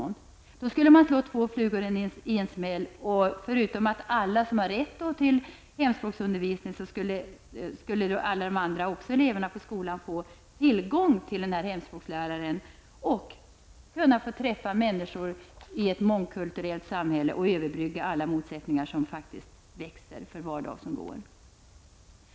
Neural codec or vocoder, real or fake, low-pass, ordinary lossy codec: none; real; none; none